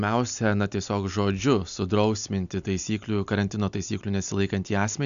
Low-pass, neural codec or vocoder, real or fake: 7.2 kHz; none; real